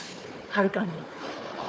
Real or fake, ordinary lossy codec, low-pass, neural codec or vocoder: fake; none; none; codec, 16 kHz, 4 kbps, FunCodec, trained on Chinese and English, 50 frames a second